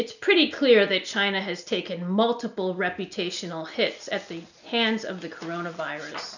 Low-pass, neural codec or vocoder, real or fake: 7.2 kHz; none; real